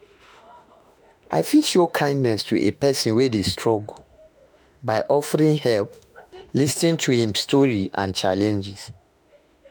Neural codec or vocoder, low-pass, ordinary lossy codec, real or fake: autoencoder, 48 kHz, 32 numbers a frame, DAC-VAE, trained on Japanese speech; none; none; fake